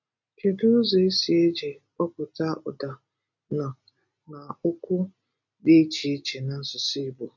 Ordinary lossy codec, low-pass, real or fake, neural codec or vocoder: none; 7.2 kHz; real; none